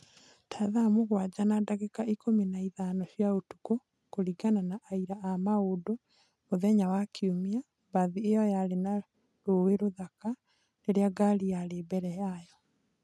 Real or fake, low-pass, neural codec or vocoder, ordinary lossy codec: real; none; none; none